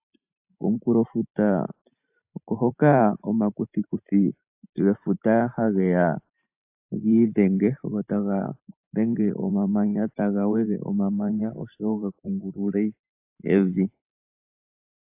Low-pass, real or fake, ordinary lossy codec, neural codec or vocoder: 3.6 kHz; fake; AAC, 32 kbps; vocoder, 44.1 kHz, 128 mel bands every 512 samples, BigVGAN v2